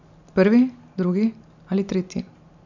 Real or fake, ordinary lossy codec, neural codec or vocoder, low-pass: real; none; none; 7.2 kHz